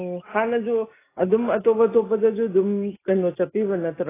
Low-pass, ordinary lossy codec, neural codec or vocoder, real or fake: 3.6 kHz; AAC, 16 kbps; none; real